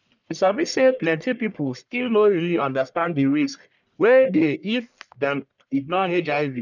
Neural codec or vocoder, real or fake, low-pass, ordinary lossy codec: codec, 44.1 kHz, 1.7 kbps, Pupu-Codec; fake; 7.2 kHz; none